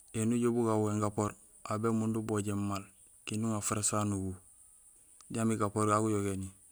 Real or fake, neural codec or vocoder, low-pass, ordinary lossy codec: real; none; none; none